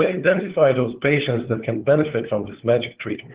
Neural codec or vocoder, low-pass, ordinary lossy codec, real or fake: codec, 16 kHz, 4 kbps, FunCodec, trained on LibriTTS, 50 frames a second; 3.6 kHz; Opus, 16 kbps; fake